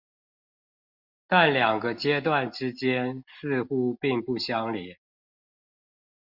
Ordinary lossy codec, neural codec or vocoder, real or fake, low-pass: Opus, 64 kbps; none; real; 5.4 kHz